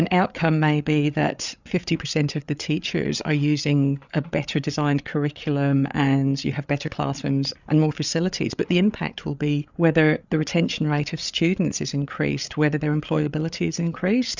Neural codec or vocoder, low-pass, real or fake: codec, 16 kHz, 8 kbps, FreqCodec, larger model; 7.2 kHz; fake